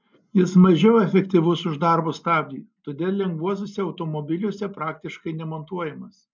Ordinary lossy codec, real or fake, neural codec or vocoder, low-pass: MP3, 64 kbps; real; none; 7.2 kHz